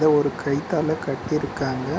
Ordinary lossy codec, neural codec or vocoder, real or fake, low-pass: none; none; real; none